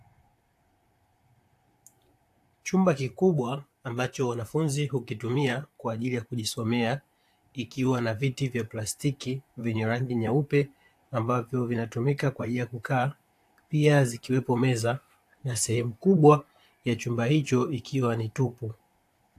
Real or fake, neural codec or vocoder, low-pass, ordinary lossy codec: fake; vocoder, 44.1 kHz, 128 mel bands, Pupu-Vocoder; 14.4 kHz; AAC, 64 kbps